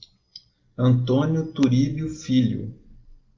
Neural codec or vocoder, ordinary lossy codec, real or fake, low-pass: none; Opus, 32 kbps; real; 7.2 kHz